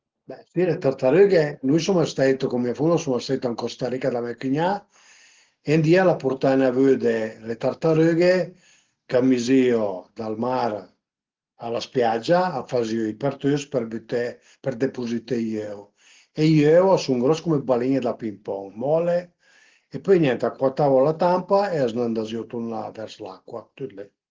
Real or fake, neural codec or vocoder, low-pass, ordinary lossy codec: real; none; 7.2 kHz; Opus, 16 kbps